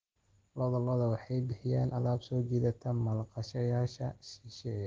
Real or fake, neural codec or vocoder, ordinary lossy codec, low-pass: real; none; Opus, 16 kbps; 7.2 kHz